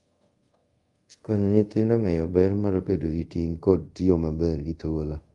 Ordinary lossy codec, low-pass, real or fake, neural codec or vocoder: Opus, 32 kbps; 10.8 kHz; fake; codec, 24 kHz, 0.5 kbps, DualCodec